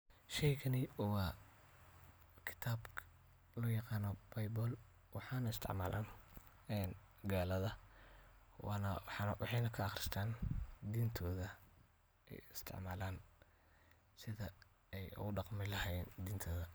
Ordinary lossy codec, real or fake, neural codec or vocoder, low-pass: none; real; none; none